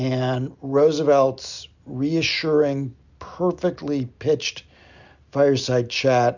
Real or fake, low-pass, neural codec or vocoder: real; 7.2 kHz; none